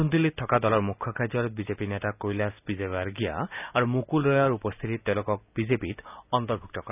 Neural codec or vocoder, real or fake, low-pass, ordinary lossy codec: none; real; 3.6 kHz; none